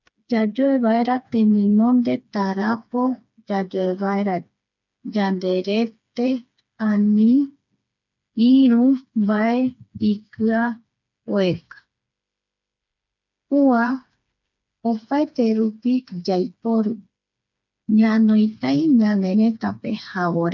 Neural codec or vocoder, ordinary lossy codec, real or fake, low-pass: codec, 16 kHz, 4 kbps, FreqCodec, smaller model; none; fake; 7.2 kHz